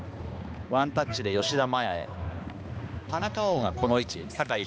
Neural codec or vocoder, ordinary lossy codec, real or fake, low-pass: codec, 16 kHz, 2 kbps, X-Codec, HuBERT features, trained on balanced general audio; none; fake; none